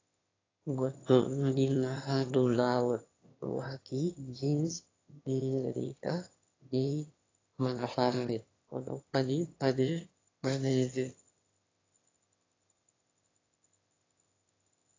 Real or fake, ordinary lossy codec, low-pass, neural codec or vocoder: fake; AAC, 32 kbps; 7.2 kHz; autoencoder, 22.05 kHz, a latent of 192 numbers a frame, VITS, trained on one speaker